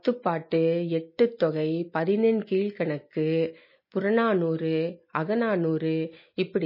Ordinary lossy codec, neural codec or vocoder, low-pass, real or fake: MP3, 24 kbps; none; 5.4 kHz; real